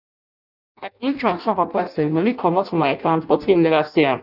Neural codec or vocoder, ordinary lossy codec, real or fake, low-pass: codec, 16 kHz in and 24 kHz out, 0.6 kbps, FireRedTTS-2 codec; none; fake; 5.4 kHz